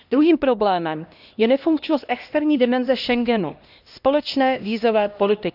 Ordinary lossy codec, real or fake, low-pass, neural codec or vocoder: none; fake; 5.4 kHz; codec, 16 kHz, 1 kbps, X-Codec, HuBERT features, trained on LibriSpeech